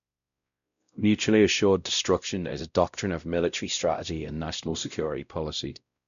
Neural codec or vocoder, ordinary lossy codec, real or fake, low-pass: codec, 16 kHz, 0.5 kbps, X-Codec, WavLM features, trained on Multilingual LibriSpeech; none; fake; 7.2 kHz